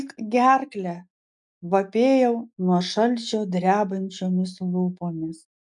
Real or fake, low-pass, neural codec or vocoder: real; 10.8 kHz; none